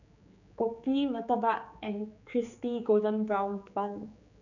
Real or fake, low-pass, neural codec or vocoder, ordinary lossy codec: fake; 7.2 kHz; codec, 16 kHz, 4 kbps, X-Codec, HuBERT features, trained on general audio; none